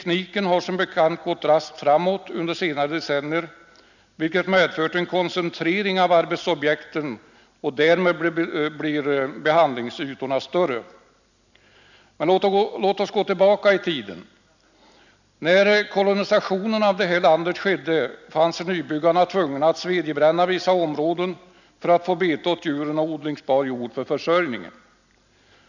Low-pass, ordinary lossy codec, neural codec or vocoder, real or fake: 7.2 kHz; none; none; real